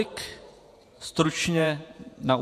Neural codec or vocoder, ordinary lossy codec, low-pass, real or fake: vocoder, 48 kHz, 128 mel bands, Vocos; MP3, 64 kbps; 14.4 kHz; fake